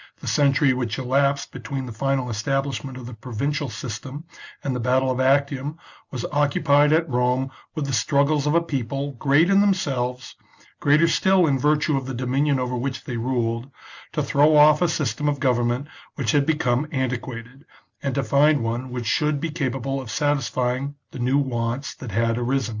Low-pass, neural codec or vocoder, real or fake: 7.2 kHz; none; real